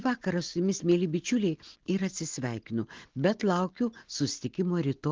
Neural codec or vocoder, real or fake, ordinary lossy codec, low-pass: none; real; Opus, 16 kbps; 7.2 kHz